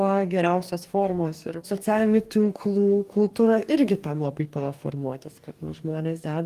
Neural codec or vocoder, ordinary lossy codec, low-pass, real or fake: codec, 44.1 kHz, 2.6 kbps, DAC; Opus, 32 kbps; 14.4 kHz; fake